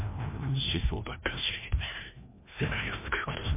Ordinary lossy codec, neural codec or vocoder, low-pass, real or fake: MP3, 24 kbps; codec, 16 kHz, 1 kbps, FreqCodec, larger model; 3.6 kHz; fake